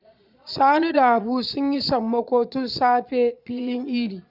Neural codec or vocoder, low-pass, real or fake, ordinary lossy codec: vocoder, 22.05 kHz, 80 mel bands, Vocos; 5.4 kHz; fake; none